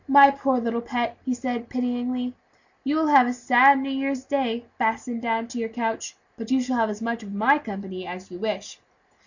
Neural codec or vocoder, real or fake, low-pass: none; real; 7.2 kHz